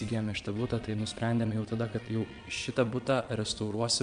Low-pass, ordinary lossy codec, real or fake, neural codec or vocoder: 9.9 kHz; MP3, 64 kbps; fake; vocoder, 22.05 kHz, 80 mel bands, Vocos